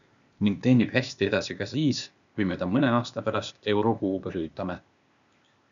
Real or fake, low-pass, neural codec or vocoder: fake; 7.2 kHz; codec, 16 kHz, 0.8 kbps, ZipCodec